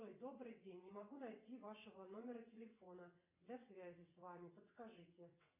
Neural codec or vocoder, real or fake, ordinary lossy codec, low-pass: vocoder, 22.05 kHz, 80 mel bands, WaveNeXt; fake; MP3, 32 kbps; 3.6 kHz